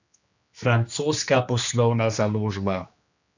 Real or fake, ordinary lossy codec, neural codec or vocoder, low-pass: fake; none; codec, 16 kHz, 2 kbps, X-Codec, HuBERT features, trained on general audio; 7.2 kHz